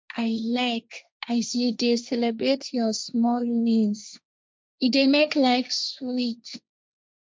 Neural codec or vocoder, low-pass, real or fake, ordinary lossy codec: codec, 16 kHz, 1.1 kbps, Voila-Tokenizer; none; fake; none